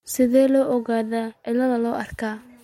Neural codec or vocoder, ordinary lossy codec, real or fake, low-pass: none; MP3, 64 kbps; real; 19.8 kHz